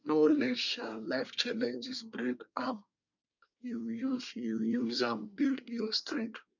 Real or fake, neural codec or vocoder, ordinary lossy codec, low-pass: fake; codec, 24 kHz, 1 kbps, SNAC; none; 7.2 kHz